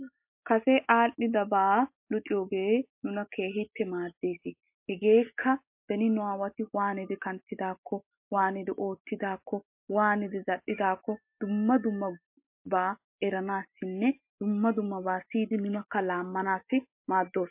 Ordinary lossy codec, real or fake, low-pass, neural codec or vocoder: MP3, 32 kbps; real; 3.6 kHz; none